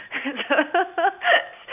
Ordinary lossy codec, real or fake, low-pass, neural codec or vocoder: none; real; 3.6 kHz; none